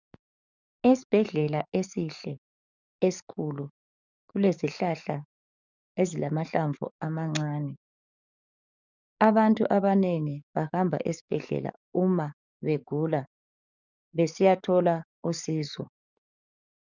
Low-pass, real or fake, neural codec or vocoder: 7.2 kHz; fake; codec, 44.1 kHz, 7.8 kbps, DAC